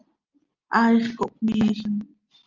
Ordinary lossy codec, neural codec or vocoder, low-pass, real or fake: Opus, 24 kbps; none; 7.2 kHz; real